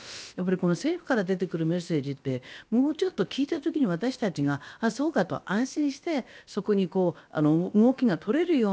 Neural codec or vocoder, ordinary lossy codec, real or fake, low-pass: codec, 16 kHz, about 1 kbps, DyCAST, with the encoder's durations; none; fake; none